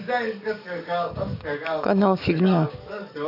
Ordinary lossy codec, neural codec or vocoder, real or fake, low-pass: none; codec, 44.1 kHz, 7.8 kbps, DAC; fake; 5.4 kHz